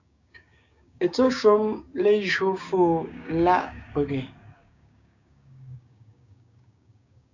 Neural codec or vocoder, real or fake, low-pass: codec, 16 kHz, 6 kbps, DAC; fake; 7.2 kHz